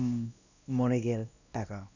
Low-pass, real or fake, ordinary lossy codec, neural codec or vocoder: 7.2 kHz; fake; none; codec, 16 kHz, 1 kbps, X-Codec, WavLM features, trained on Multilingual LibriSpeech